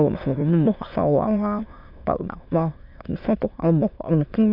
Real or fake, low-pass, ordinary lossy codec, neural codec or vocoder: fake; 5.4 kHz; AAC, 48 kbps; autoencoder, 22.05 kHz, a latent of 192 numbers a frame, VITS, trained on many speakers